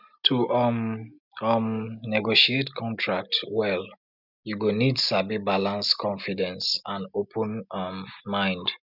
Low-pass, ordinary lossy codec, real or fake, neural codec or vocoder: 5.4 kHz; none; real; none